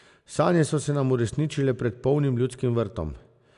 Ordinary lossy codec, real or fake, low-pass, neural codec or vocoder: none; real; 10.8 kHz; none